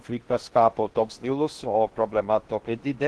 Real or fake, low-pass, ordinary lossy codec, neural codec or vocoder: fake; 10.8 kHz; Opus, 16 kbps; codec, 16 kHz in and 24 kHz out, 0.8 kbps, FocalCodec, streaming, 65536 codes